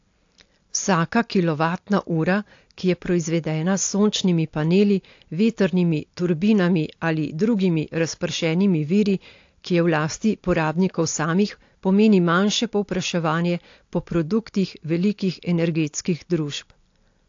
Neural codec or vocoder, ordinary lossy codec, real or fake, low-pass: none; AAC, 48 kbps; real; 7.2 kHz